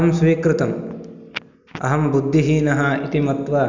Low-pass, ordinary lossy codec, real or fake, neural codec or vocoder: 7.2 kHz; none; real; none